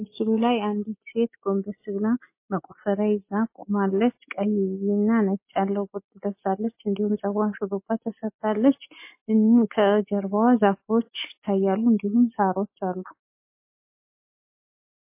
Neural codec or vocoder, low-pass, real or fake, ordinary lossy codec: none; 3.6 kHz; real; MP3, 24 kbps